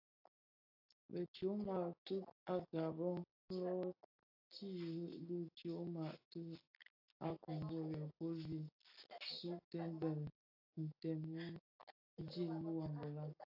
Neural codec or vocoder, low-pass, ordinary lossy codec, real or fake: none; 5.4 kHz; AAC, 32 kbps; real